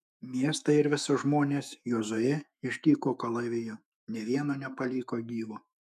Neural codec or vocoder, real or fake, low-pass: autoencoder, 48 kHz, 128 numbers a frame, DAC-VAE, trained on Japanese speech; fake; 14.4 kHz